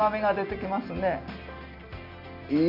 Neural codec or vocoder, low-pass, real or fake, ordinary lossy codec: none; 5.4 kHz; real; none